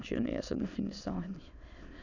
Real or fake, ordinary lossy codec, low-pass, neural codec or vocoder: fake; none; 7.2 kHz; autoencoder, 22.05 kHz, a latent of 192 numbers a frame, VITS, trained on many speakers